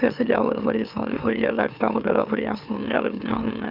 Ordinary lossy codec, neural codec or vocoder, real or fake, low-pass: none; autoencoder, 44.1 kHz, a latent of 192 numbers a frame, MeloTTS; fake; 5.4 kHz